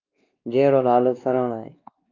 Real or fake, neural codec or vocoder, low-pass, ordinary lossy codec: fake; codec, 16 kHz, 2 kbps, X-Codec, WavLM features, trained on Multilingual LibriSpeech; 7.2 kHz; Opus, 24 kbps